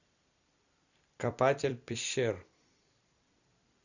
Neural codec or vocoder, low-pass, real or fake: vocoder, 44.1 kHz, 128 mel bands every 256 samples, BigVGAN v2; 7.2 kHz; fake